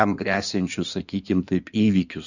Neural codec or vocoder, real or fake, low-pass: codec, 16 kHz in and 24 kHz out, 2.2 kbps, FireRedTTS-2 codec; fake; 7.2 kHz